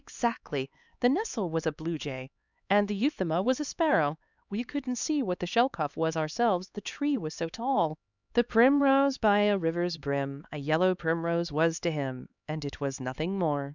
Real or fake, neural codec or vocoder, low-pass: fake; codec, 16 kHz, 4 kbps, X-Codec, HuBERT features, trained on LibriSpeech; 7.2 kHz